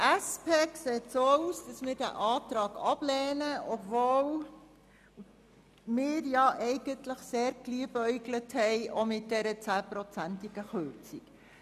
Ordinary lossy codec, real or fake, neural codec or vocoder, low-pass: none; real; none; 14.4 kHz